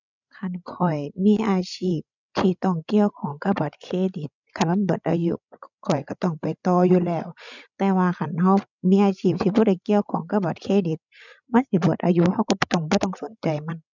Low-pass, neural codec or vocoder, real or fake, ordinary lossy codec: 7.2 kHz; codec, 16 kHz, 16 kbps, FreqCodec, larger model; fake; none